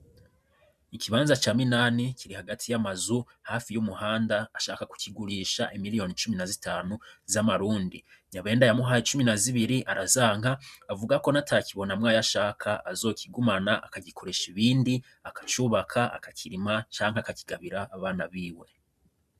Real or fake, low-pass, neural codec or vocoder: fake; 14.4 kHz; vocoder, 44.1 kHz, 128 mel bands every 512 samples, BigVGAN v2